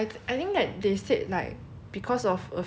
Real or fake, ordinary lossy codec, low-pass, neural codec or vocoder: real; none; none; none